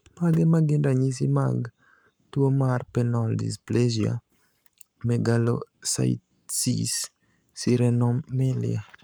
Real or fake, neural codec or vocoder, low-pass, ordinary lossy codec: fake; codec, 44.1 kHz, 7.8 kbps, Pupu-Codec; none; none